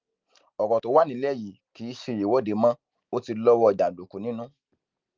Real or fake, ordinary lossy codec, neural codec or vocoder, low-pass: real; Opus, 32 kbps; none; 7.2 kHz